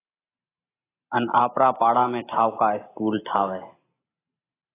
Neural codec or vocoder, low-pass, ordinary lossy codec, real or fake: none; 3.6 kHz; AAC, 16 kbps; real